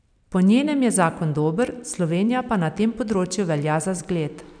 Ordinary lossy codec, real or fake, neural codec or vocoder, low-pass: none; fake; vocoder, 44.1 kHz, 128 mel bands every 256 samples, BigVGAN v2; 9.9 kHz